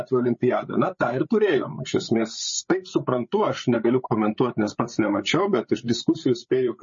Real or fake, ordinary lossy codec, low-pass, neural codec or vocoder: fake; MP3, 32 kbps; 7.2 kHz; codec, 16 kHz, 8 kbps, FreqCodec, larger model